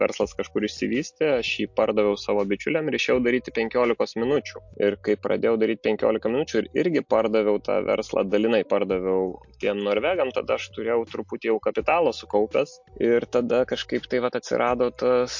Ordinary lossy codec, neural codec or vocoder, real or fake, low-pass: MP3, 48 kbps; none; real; 7.2 kHz